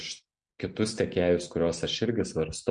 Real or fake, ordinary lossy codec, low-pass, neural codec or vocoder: real; MP3, 64 kbps; 9.9 kHz; none